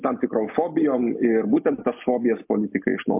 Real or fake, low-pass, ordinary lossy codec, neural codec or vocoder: real; 3.6 kHz; MP3, 32 kbps; none